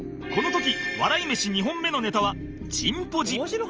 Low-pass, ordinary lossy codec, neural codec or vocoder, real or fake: 7.2 kHz; Opus, 24 kbps; none; real